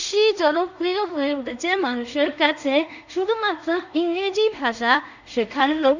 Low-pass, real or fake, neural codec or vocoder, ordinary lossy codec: 7.2 kHz; fake; codec, 16 kHz in and 24 kHz out, 0.4 kbps, LongCat-Audio-Codec, two codebook decoder; none